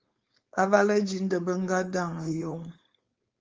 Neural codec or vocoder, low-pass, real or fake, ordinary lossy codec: codec, 16 kHz, 4.8 kbps, FACodec; 7.2 kHz; fake; Opus, 32 kbps